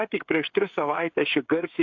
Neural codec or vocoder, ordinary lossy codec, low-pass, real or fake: codec, 16 kHz, 16 kbps, FreqCodec, smaller model; AAC, 48 kbps; 7.2 kHz; fake